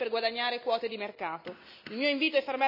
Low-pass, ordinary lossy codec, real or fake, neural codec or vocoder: 5.4 kHz; none; real; none